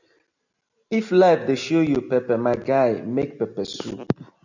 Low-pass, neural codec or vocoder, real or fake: 7.2 kHz; none; real